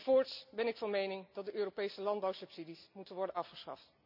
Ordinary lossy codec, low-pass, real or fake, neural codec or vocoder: none; 5.4 kHz; real; none